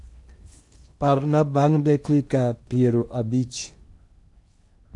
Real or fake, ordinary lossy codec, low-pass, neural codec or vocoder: fake; AAC, 64 kbps; 10.8 kHz; codec, 16 kHz in and 24 kHz out, 0.8 kbps, FocalCodec, streaming, 65536 codes